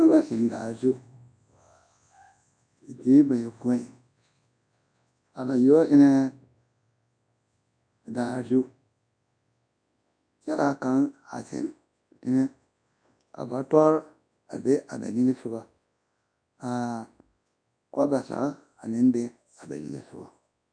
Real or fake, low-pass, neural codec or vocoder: fake; 9.9 kHz; codec, 24 kHz, 0.9 kbps, WavTokenizer, large speech release